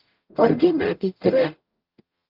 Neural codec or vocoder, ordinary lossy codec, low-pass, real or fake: codec, 44.1 kHz, 0.9 kbps, DAC; Opus, 32 kbps; 5.4 kHz; fake